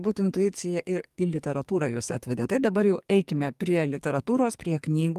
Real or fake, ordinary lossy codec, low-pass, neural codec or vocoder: fake; Opus, 32 kbps; 14.4 kHz; codec, 44.1 kHz, 2.6 kbps, SNAC